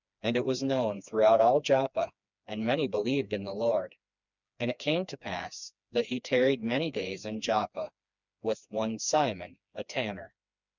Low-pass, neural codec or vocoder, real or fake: 7.2 kHz; codec, 16 kHz, 2 kbps, FreqCodec, smaller model; fake